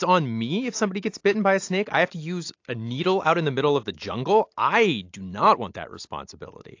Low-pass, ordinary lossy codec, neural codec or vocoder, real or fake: 7.2 kHz; AAC, 48 kbps; none; real